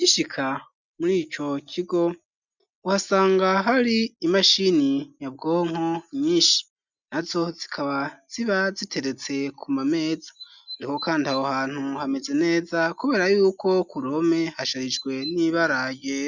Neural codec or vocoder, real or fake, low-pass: none; real; 7.2 kHz